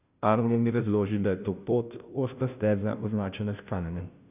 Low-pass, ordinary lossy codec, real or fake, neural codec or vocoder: 3.6 kHz; none; fake; codec, 16 kHz, 0.5 kbps, FunCodec, trained on Chinese and English, 25 frames a second